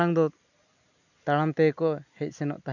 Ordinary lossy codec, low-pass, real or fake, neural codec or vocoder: none; 7.2 kHz; real; none